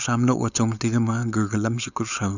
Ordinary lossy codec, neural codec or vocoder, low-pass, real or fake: none; codec, 16 kHz, 4.8 kbps, FACodec; 7.2 kHz; fake